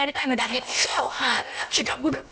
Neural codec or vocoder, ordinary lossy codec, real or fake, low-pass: codec, 16 kHz, about 1 kbps, DyCAST, with the encoder's durations; none; fake; none